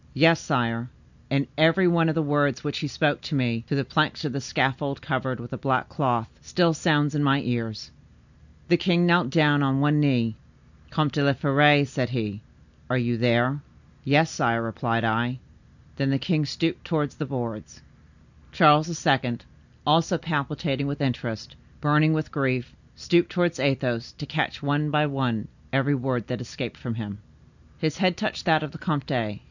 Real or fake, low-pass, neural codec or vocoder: real; 7.2 kHz; none